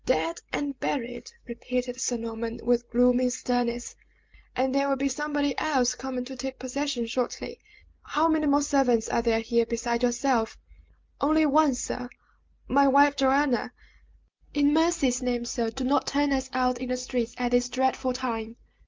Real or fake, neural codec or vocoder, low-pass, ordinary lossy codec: real; none; 7.2 kHz; Opus, 24 kbps